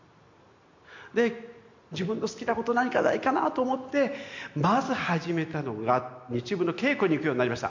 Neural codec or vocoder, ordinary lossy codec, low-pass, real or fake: none; MP3, 64 kbps; 7.2 kHz; real